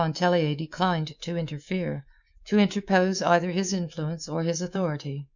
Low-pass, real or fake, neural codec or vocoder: 7.2 kHz; fake; codec, 24 kHz, 3.1 kbps, DualCodec